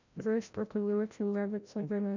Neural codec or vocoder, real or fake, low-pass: codec, 16 kHz, 0.5 kbps, FreqCodec, larger model; fake; 7.2 kHz